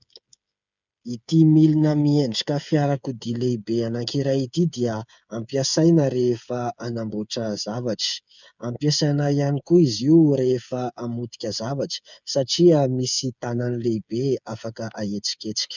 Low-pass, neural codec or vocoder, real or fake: 7.2 kHz; codec, 16 kHz, 8 kbps, FreqCodec, smaller model; fake